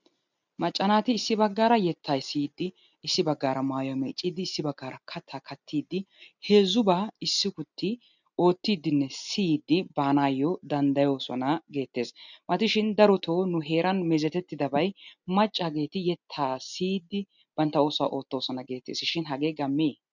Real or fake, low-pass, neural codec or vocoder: real; 7.2 kHz; none